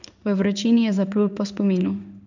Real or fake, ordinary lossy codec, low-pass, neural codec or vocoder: fake; none; 7.2 kHz; codec, 16 kHz in and 24 kHz out, 1 kbps, XY-Tokenizer